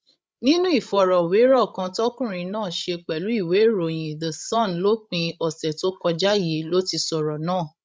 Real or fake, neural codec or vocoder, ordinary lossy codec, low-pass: fake; codec, 16 kHz, 16 kbps, FreqCodec, larger model; none; none